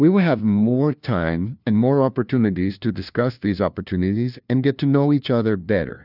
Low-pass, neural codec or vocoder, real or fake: 5.4 kHz; codec, 16 kHz, 1 kbps, FunCodec, trained on LibriTTS, 50 frames a second; fake